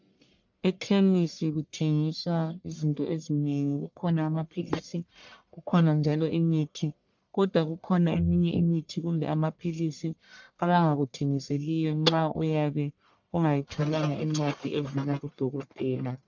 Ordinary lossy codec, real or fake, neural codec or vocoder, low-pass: MP3, 64 kbps; fake; codec, 44.1 kHz, 1.7 kbps, Pupu-Codec; 7.2 kHz